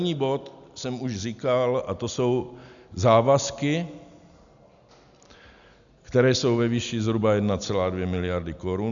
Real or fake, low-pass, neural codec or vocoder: real; 7.2 kHz; none